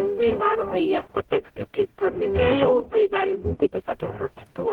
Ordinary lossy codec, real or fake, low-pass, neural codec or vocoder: Opus, 16 kbps; fake; 19.8 kHz; codec, 44.1 kHz, 0.9 kbps, DAC